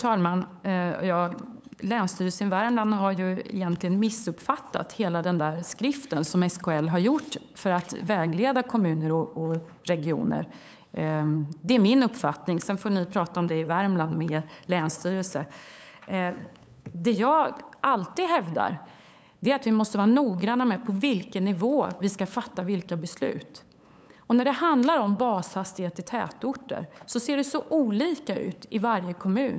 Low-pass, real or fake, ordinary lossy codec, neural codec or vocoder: none; fake; none; codec, 16 kHz, 8 kbps, FunCodec, trained on LibriTTS, 25 frames a second